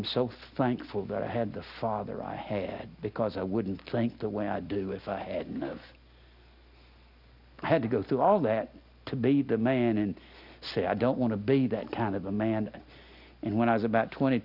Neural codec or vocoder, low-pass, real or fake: none; 5.4 kHz; real